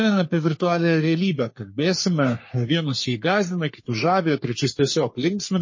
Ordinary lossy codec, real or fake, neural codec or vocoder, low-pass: MP3, 32 kbps; fake; codec, 44.1 kHz, 3.4 kbps, Pupu-Codec; 7.2 kHz